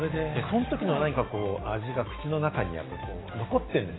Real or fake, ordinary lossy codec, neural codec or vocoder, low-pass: real; AAC, 16 kbps; none; 7.2 kHz